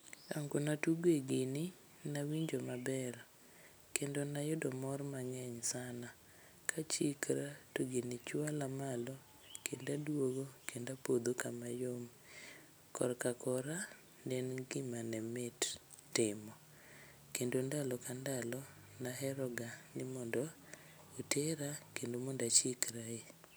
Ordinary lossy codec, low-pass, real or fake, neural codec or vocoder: none; none; real; none